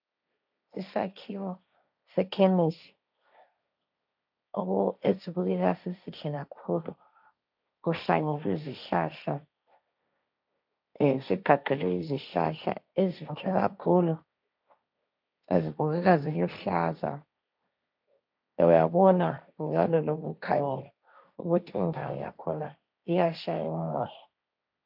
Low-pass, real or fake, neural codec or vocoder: 5.4 kHz; fake; codec, 16 kHz, 1.1 kbps, Voila-Tokenizer